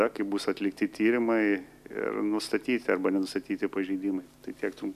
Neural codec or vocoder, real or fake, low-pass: none; real; 14.4 kHz